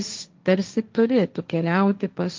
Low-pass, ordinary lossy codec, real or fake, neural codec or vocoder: 7.2 kHz; Opus, 24 kbps; fake; codec, 16 kHz, 1.1 kbps, Voila-Tokenizer